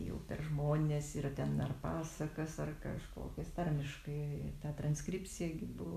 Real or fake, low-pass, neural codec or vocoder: real; 14.4 kHz; none